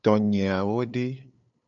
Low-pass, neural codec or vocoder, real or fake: 7.2 kHz; codec, 16 kHz, 8 kbps, FunCodec, trained on LibriTTS, 25 frames a second; fake